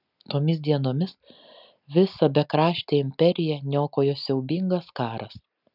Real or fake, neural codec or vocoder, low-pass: real; none; 5.4 kHz